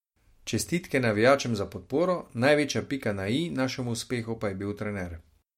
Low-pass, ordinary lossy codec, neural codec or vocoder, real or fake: 19.8 kHz; MP3, 64 kbps; none; real